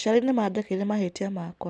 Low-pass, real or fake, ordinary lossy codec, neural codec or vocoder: none; real; none; none